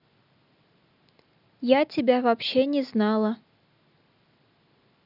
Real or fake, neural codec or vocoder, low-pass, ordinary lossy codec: real; none; 5.4 kHz; none